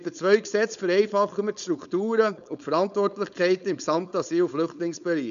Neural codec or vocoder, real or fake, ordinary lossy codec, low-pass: codec, 16 kHz, 4.8 kbps, FACodec; fake; none; 7.2 kHz